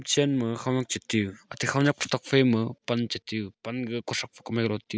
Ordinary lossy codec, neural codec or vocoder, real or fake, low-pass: none; none; real; none